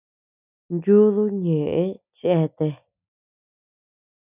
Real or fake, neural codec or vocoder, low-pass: real; none; 3.6 kHz